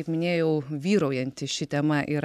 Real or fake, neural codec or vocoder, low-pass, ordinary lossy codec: real; none; 14.4 kHz; MP3, 96 kbps